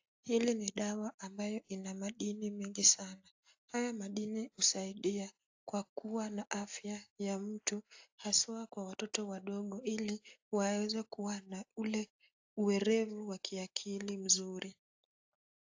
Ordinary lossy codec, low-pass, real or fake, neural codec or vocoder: AAC, 48 kbps; 7.2 kHz; real; none